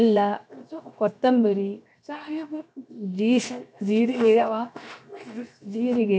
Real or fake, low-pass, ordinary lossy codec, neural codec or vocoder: fake; none; none; codec, 16 kHz, 0.7 kbps, FocalCodec